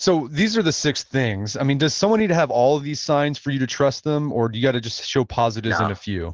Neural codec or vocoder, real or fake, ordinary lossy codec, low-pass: none; real; Opus, 16 kbps; 7.2 kHz